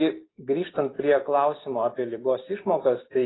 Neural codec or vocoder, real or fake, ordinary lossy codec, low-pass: none; real; AAC, 16 kbps; 7.2 kHz